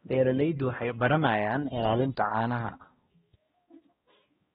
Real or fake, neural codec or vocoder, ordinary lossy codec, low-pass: fake; codec, 16 kHz, 1 kbps, X-Codec, HuBERT features, trained on balanced general audio; AAC, 16 kbps; 7.2 kHz